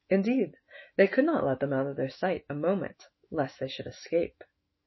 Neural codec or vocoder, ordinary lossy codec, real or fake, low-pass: autoencoder, 48 kHz, 128 numbers a frame, DAC-VAE, trained on Japanese speech; MP3, 24 kbps; fake; 7.2 kHz